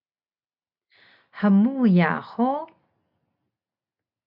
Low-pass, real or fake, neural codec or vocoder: 5.4 kHz; real; none